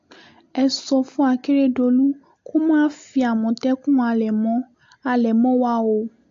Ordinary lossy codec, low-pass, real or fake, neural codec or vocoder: AAC, 48 kbps; 7.2 kHz; real; none